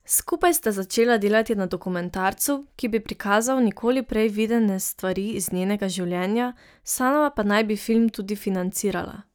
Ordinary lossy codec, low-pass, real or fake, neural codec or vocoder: none; none; real; none